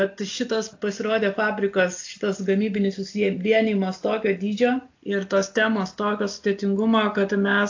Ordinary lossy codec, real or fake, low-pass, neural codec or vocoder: AAC, 48 kbps; real; 7.2 kHz; none